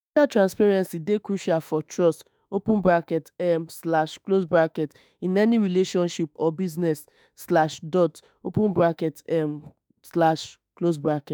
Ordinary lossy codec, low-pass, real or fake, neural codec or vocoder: none; none; fake; autoencoder, 48 kHz, 32 numbers a frame, DAC-VAE, trained on Japanese speech